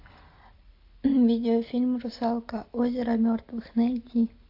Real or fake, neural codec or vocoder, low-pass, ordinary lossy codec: real; none; 5.4 kHz; AAC, 32 kbps